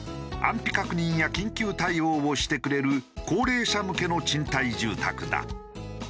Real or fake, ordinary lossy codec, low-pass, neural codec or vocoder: real; none; none; none